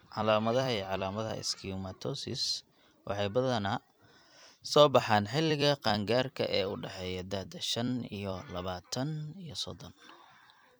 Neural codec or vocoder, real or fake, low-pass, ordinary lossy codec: vocoder, 44.1 kHz, 128 mel bands every 256 samples, BigVGAN v2; fake; none; none